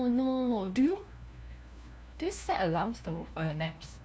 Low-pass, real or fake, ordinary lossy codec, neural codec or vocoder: none; fake; none; codec, 16 kHz, 1 kbps, FunCodec, trained on LibriTTS, 50 frames a second